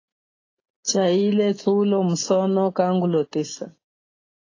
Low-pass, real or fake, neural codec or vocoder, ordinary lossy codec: 7.2 kHz; real; none; AAC, 32 kbps